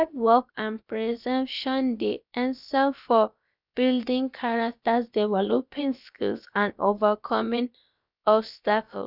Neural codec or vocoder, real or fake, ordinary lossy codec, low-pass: codec, 16 kHz, about 1 kbps, DyCAST, with the encoder's durations; fake; none; 5.4 kHz